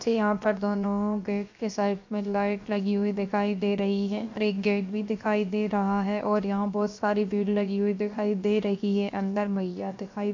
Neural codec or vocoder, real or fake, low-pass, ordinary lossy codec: codec, 16 kHz, 0.7 kbps, FocalCodec; fake; 7.2 kHz; MP3, 48 kbps